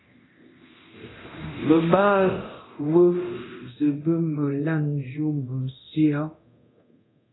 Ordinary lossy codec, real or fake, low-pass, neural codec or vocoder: AAC, 16 kbps; fake; 7.2 kHz; codec, 24 kHz, 0.9 kbps, DualCodec